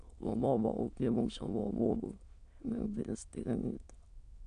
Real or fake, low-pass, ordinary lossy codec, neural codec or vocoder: fake; 9.9 kHz; AAC, 64 kbps; autoencoder, 22.05 kHz, a latent of 192 numbers a frame, VITS, trained on many speakers